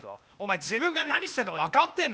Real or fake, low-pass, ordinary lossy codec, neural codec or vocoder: fake; none; none; codec, 16 kHz, 0.8 kbps, ZipCodec